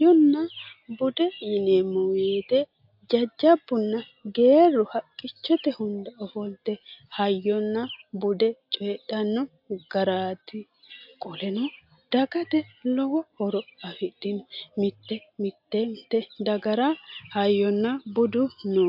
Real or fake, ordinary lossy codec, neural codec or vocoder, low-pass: real; AAC, 48 kbps; none; 5.4 kHz